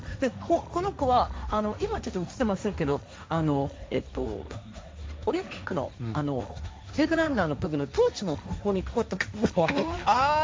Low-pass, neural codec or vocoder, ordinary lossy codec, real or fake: none; codec, 16 kHz, 1.1 kbps, Voila-Tokenizer; none; fake